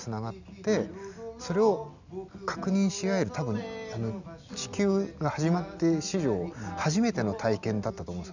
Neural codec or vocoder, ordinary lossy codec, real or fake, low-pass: none; none; real; 7.2 kHz